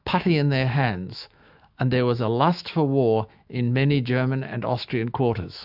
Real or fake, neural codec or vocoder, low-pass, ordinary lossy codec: fake; autoencoder, 48 kHz, 128 numbers a frame, DAC-VAE, trained on Japanese speech; 5.4 kHz; AAC, 48 kbps